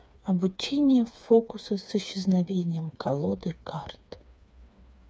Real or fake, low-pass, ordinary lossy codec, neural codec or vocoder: fake; none; none; codec, 16 kHz, 4 kbps, FreqCodec, smaller model